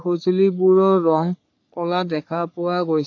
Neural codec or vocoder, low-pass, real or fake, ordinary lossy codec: codec, 44.1 kHz, 3.4 kbps, Pupu-Codec; 7.2 kHz; fake; AAC, 48 kbps